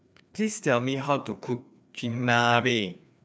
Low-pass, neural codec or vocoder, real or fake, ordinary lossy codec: none; codec, 16 kHz, 2 kbps, FreqCodec, larger model; fake; none